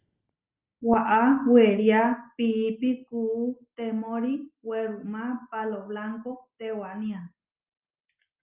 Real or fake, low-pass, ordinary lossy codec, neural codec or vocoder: real; 3.6 kHz; Opus, 24 kbps; none